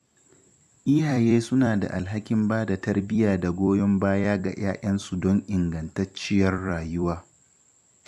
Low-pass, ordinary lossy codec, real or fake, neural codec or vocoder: 14.4 kHz; MP3, 96 kbps; fake; vocoder, 44.1 kHz, 128 mel bands every 256 samples, BigVGAN v2